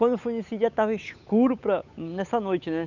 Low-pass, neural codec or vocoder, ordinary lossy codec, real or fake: 7.2 kHz; codec, 16 kHz, 8 kbps, FunCodec, trained on Chinese and English, 25 frames a second; none; fake